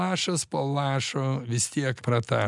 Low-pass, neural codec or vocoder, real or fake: 10.8 kHz; none; real